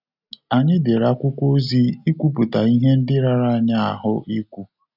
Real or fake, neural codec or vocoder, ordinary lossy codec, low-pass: real; none; none; 5.4 kHz